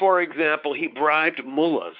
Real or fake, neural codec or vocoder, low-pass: fake; codec, 16 kHz, 4 kbps, X-Codec, WavLM features, trained on Multilingual LibriSpeech; 5.4 kHz